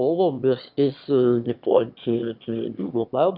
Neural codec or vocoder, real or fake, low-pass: autoencoder, 22.05 kHz, a latent of 192 numbers a frame, VITS, trained on one speaker; fake; 5.4 kHz